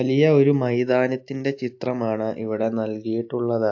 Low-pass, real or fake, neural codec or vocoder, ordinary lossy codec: 7.2 kHz; real; none; AAC, 48 kbps